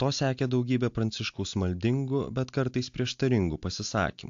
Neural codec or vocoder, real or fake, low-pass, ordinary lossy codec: none; real; 7.2 kHz; AAC, 64 kbps